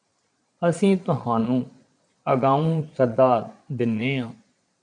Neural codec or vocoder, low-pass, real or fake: vocoder, 22.05 kHz, 80 mel bands, Vocos; 9.9 kHz; fake